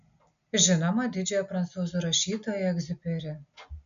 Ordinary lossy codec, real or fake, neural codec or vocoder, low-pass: MP3, 64 kbps; real; none; 7.2 kHz